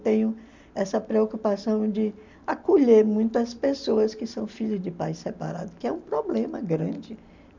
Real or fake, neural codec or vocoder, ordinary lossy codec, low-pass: real; none; MP3, 64 kbps; 7.2 kHz